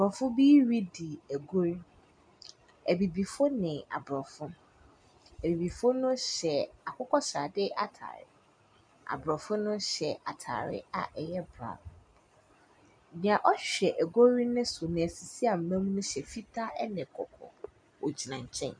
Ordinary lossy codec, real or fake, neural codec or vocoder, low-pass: MP3, 96 kbps; real; none; 9.9 kHz